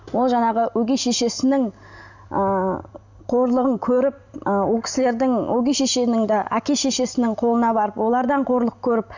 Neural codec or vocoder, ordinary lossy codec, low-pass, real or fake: none; none; 7.2 kHz; real